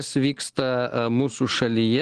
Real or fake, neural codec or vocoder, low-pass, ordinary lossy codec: real; none; 9.9 kHz; Opus, 16 kbps